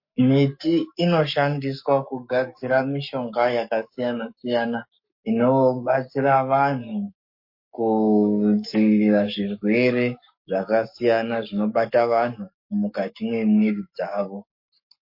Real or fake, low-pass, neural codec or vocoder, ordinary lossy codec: fake; 5.4 kHz; codec, 44.1 kHz, 7.8 kbps, Pupu-Codec; MP3, 32 kbps